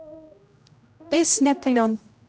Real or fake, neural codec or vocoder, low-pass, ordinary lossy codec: fake; codec, 16 kHz, 0.5 kbps, X-Codec, HuBERT features, trained on general audio; none; none